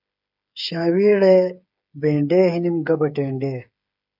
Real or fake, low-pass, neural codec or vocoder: fake; 5.4 kHz; codec, 16 kHz, 8 kbps, FreqCodec, smaller model